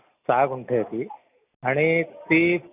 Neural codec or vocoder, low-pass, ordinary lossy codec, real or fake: none; 3.6 kHz; none; real